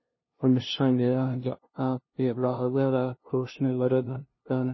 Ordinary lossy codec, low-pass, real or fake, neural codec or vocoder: MP3, 24 kbps; 7.2 kHz; fake; codec, 16 kHz, 0.5 kbps, FunCodec, trained on LibriTTS, 25 frames a second